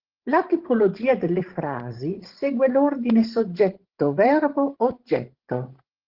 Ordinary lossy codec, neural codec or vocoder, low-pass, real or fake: Opus, 16 kbps; codec, 16 kHz, 16 kbps, FreqCodec, larger model; 5.4 kHz; fake